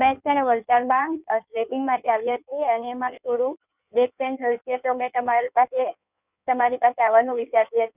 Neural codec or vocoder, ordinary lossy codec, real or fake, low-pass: codec, 16 kHz in and 24 kHz out, 1.1 kbps, FireRedTTS-2 codec; none; fake; 3.6 kHz